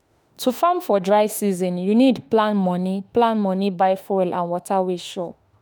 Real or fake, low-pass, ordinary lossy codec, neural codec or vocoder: fake; none; none; autoencoder, 48 kHz, 32 numbers a frame, DAC-VAE, trained on Japanese speech